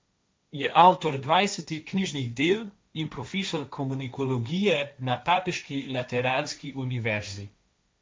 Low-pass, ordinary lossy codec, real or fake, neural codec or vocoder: none; none; fake; codec, 16 kHz, 1.1 kbps, Voila-Tokenizer